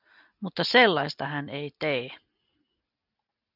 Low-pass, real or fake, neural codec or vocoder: 5.4 kHz; real; none